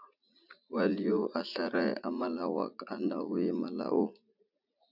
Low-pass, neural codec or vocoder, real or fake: 5.4 kHz; vocoder, 44.1 kHz, 80 mel bands, Vocos; fake